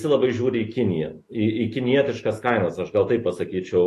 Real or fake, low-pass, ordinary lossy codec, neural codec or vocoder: fake; 14.4 kHz; AAC, 48 kbps; autoencoder, 48 kHz, 128 numbers a frame, DAC-VAE, trained on Japanese speech